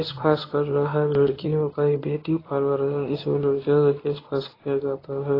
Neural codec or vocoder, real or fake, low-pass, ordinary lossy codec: codec, 24 kHz, 0.9 kbps, WavTokenizer, medium speech release version 1; fake; 5.4 kHz; AAC, 24 kbps